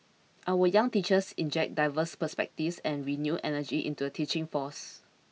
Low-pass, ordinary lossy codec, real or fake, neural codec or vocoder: none; none; real; none